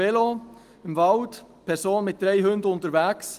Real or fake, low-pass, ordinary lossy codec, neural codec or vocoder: real; 14.4 kHz; Opus, 24 kbps; none